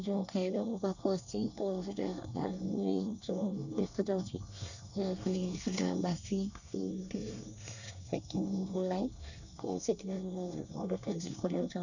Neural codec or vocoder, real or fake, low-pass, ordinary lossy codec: codec, 24 kHz, 1 kbps, SNAC; fake; 7.2 kHz; none